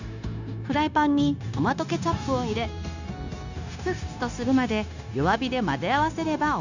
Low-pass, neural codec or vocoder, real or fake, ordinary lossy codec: 7.2 kHz; codec, 16 kHz, 0.9 kbps, LongCat-Audio-Codec; fake; none